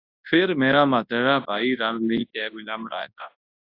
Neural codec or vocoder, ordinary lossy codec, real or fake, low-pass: codec, 24 kHz, 0.9 kbps, WavTokenizer, large speech release; AAC, 32 kbps; fake; 5.4 kHz